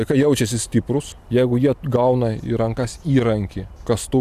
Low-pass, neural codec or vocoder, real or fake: 14.4 kHz; vocoder, 44.1 kHz, 128 mel bands every 512 samples, BigVGAN v2; fake